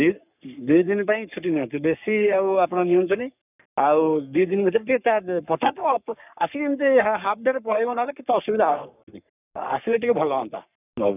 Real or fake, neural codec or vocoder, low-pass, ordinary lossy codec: fake; codec, 44.1 kHz, 3.4 kbps, Pupu-Codec; 3.6 kHz; none